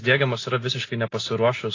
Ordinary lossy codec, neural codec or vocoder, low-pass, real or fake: AAC, 32 kbps; none; 7.2 kHz; real